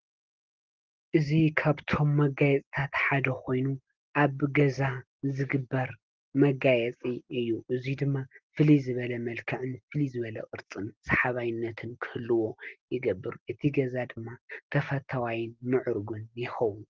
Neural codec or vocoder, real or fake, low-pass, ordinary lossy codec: none; real; 7.2 kHz; Opus, 16 kbps